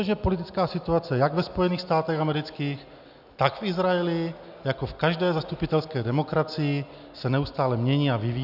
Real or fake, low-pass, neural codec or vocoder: real; 5.4 kHz; none